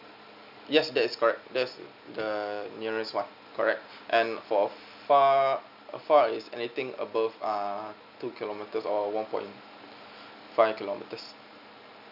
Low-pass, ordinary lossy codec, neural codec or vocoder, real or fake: 5.4 kHz; none; none; real